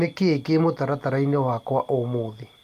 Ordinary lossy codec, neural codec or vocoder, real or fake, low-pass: Opus, 32 kbps; none; real; 14.4 kHz